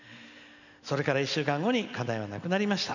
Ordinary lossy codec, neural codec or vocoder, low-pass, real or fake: none; none; 7.2 kHz; real